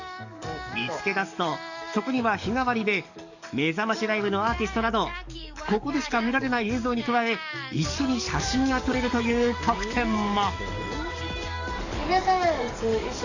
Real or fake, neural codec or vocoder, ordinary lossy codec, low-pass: fake; codec, 44.1 kHz, 7.8 kbps, DAC; none; 7.2 kHz